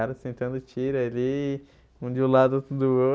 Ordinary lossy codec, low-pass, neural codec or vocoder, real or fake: none; none; none; real